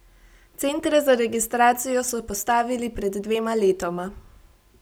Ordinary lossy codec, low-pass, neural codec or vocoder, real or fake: none; none; none; real